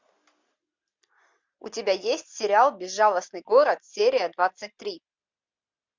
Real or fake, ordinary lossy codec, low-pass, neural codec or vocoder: real; MP3, 64 kbps; 7.2 kHz; none